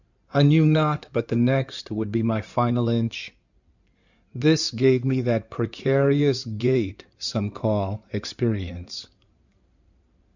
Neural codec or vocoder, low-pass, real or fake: codec, 16 kHz in and 24 kHz out, 2.2 kbps, FireRedTTS-2 codec; 7.2 kHz; fake